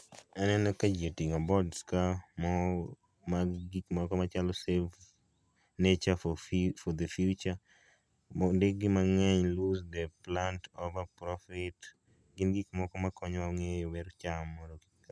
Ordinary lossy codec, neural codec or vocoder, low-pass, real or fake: none; none; none; real